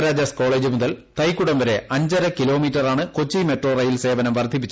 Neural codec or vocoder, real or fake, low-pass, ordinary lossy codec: none; real; none; none